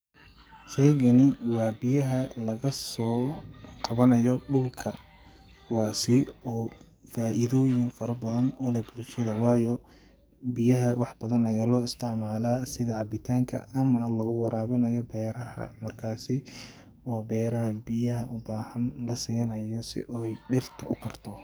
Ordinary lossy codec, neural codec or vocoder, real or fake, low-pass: none; codec, 44.1 kHz, 2.6 kbps, SNAC; fake; none